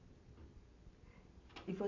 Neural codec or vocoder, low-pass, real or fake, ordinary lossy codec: none; 7.2 kHz; real; AAC, 32 kbps